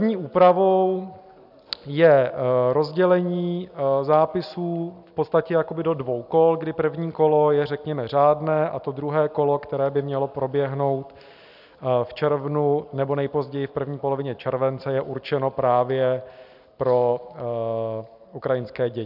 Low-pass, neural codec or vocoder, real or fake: 5.4 kHz; none; real